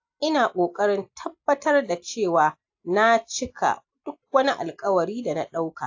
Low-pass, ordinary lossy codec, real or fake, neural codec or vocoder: 7.2 kHz; AAC, 48 kbps; real; none